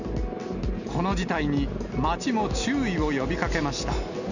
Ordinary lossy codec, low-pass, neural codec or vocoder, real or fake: none; 7.2 kHz; none; real